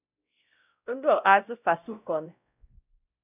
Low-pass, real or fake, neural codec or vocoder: 3.6 kHz; fake; codec, 16 kHz, 0.5 kbps, X-Codec, WavLM features, trained on Multilingual LibriSpeech